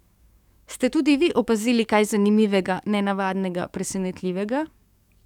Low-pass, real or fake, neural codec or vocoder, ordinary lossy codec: 19.8 kHz; fake; codec, 44.1 kHz, 7.8 kbps, DAC; none